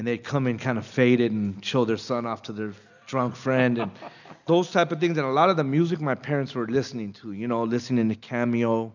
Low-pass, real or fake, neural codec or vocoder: 7.2 kHz; real; none